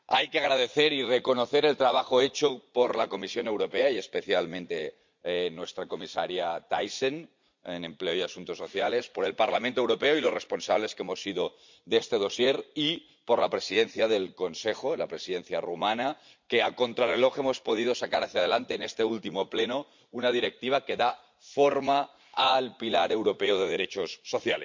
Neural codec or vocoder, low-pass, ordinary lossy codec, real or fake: vocoder, 44.1 kHz, 80 mel bands, Vocos; 7.2 kHz; MP3, 64 kbps; fake